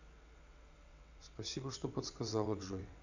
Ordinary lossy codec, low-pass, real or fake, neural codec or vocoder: none; 7.2 kHz; fake; vocoder, 22.05 kHz, 80 mel bands, WaveNeXt